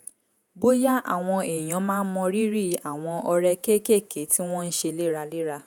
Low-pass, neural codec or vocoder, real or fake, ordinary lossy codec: none; vocoder, 48 kHz, 128 mel bands, Vocos; fake; none